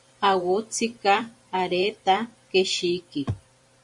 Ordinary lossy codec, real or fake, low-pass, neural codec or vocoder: MP3, 64 kbps; fake; 10.8 kHz; vocoder, 44.1 kHz, 128 mel bands every 512 samples, BigVGAN v2